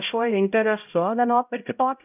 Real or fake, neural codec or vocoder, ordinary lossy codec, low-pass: fake; codec, 16 kHz, 0.5 kbps, X-Codec, HuBERT features, trained on LibriSpeech; AAC, 32 kbps; 3.6 kHz